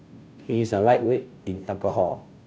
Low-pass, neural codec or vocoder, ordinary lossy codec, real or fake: none; codec, 16 kHz, 0.5 kbps, FunCodec, trained on Chinese and English, 25 frames a second; none; fake